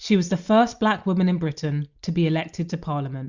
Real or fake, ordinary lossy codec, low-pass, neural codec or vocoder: real; Opus, 64 kbps; 7.2 kHz; none